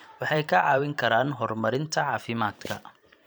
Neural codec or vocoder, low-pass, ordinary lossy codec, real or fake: vocoder, 44.1 kHz, 128 mel bands every 512 samples, BigVGAN v2; none; none; fake